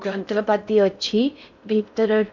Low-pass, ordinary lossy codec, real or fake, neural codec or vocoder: 7.2 kHz; none; fake; codec, 16 kHz in and 24 kHz out, 0.6 kbps, FocalCodec, streaming, 4096 codes